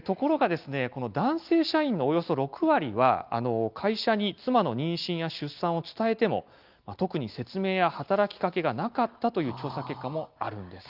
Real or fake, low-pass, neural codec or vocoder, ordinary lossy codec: real; 5.4 kHz; none; Opus, 24 kbps